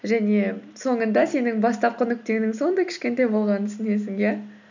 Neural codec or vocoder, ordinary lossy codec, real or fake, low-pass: none; none; real; 7.2 kHz